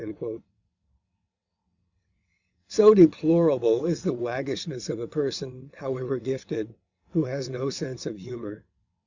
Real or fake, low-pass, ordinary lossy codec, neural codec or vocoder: fake; 7.2 kHz; Opus, 64 kbps; vocoder, 22.05 kHz, 80 mel bands, WaveNeXt